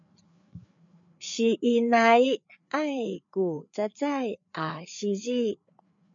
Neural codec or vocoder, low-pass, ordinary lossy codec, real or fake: codec, 16 kHz, 4 kbps, FreqCodec, larger model; 7.2 kHz; MP3, 64 kbps; fake